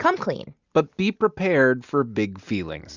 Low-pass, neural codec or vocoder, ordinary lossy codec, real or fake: 7.2 kHz; none; Opus, 64 kbps; real